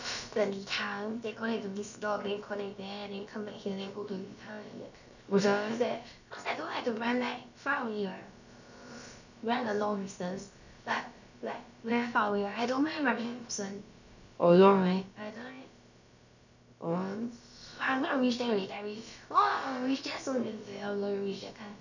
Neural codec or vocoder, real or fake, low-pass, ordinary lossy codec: codec, 16 kHz, about 1 kbps, DyCAST, with the encoder's durations; fake; 7.2 kHz; none